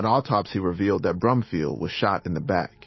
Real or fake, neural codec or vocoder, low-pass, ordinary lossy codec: fake; autoencoder, 48 kHz, 128 numbers a frame, DAC-VAE, trained on Japanese speech; 7.2 kHz; MP3, 24 kbps